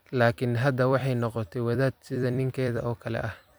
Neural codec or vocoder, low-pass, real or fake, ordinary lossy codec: vocoder, 44.1 kHz, 128 mel bands every 256 samples, BigVGAN v2; none; fake; none